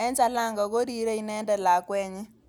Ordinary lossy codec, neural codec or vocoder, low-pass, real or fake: none; none; none; real